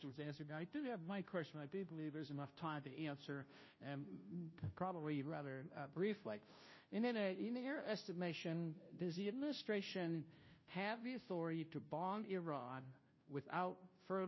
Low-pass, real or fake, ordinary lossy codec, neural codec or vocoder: 7.2 kHz; fake; MP3, 24 kbps; codec, 16 kHz, 0.5 kbps, FunCodec, trained on Chinese and English, 25 frames a second